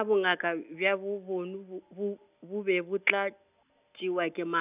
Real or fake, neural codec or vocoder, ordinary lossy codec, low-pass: real; none; none; 3.6 kHz